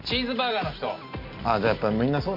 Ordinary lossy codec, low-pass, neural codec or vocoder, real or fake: MP3, 32 kbps; 5.4 kHz; none; real